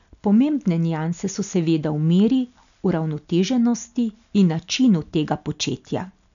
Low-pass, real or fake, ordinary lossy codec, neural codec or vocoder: 7.2 kHz; real; none; none